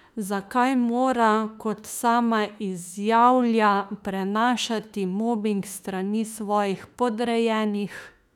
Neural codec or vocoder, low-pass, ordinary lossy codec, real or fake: autoencoder, 48 kHz, 32 numbers a frame, DAC-VAE, trained on Japanese speech; 19.8 kHz; none; fake